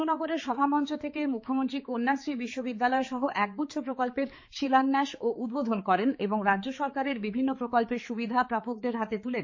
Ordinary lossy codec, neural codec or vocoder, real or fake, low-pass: MP3, 32 kbps; codec, 16 kHz, 4 kbps, X-Codec, HuBERT features, trained on balanced general audio; fake; 7.2 kHz